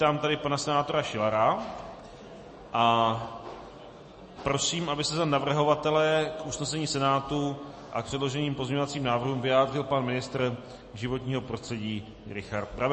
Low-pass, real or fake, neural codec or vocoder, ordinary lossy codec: 10.8 kHz; real; none; MP3, 32 kbps